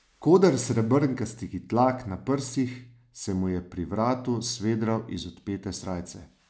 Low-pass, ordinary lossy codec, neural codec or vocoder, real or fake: none; none; none; real